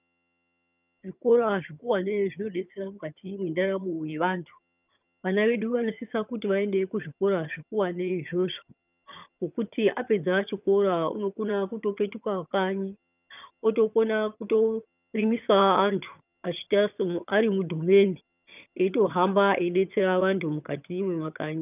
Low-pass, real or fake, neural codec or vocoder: 3.6 kHz; fake; vocoder, 22.05 kHz, 80 mel bands, HiFi-GAN